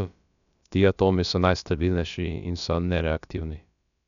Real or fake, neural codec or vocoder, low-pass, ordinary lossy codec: fake; codec, 16 kHz, about 1 kbps, DyCAST, with the encoder's durations; 7.2 kHz; none